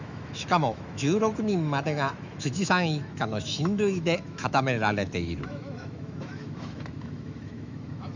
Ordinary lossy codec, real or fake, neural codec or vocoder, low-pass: none; fake; autoencoder, 48 kHz, 128 numbers a frame, DAC-VAE, trained on Japanese speech; 7.2 kHz